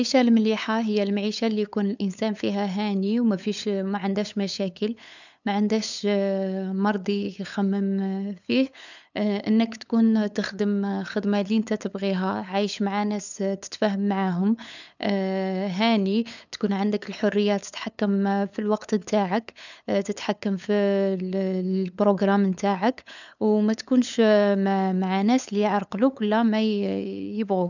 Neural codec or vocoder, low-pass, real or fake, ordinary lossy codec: codec, 16 kHz, 8 kbps, FunCodec, trained on LibriTTS, 25 frames a second; 7.2 kHz; fake; none